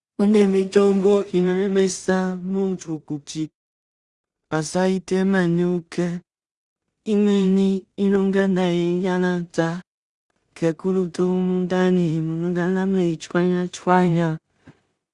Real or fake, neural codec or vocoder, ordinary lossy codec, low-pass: fake; codec, 16 kHz in and 24 kHz out, 0.4 kbps, LongCat-Audio-Codec, two codebook decoder; Opus, 64 kbps; 10.8 kHz